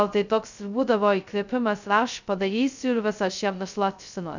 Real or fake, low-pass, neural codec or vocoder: fake; 7.2 kHz; codec, 16 kHz, 0.2 kbps, FocalCodec